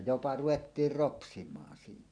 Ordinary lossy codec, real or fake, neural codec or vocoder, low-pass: none; real; none; 9.9 kHz